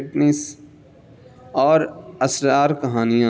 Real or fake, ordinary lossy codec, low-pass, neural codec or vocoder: real; none; none; none